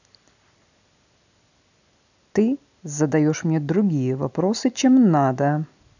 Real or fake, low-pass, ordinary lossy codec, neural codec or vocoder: real; 7.2 kHz; none; none